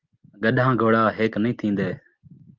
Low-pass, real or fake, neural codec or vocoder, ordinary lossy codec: 7.2 kHz; real; none; Opus, 24 kbps